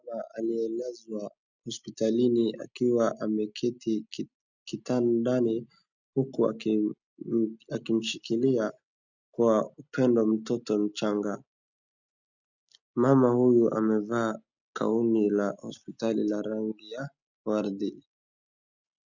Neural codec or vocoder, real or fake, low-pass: none; real; 7.2 kHz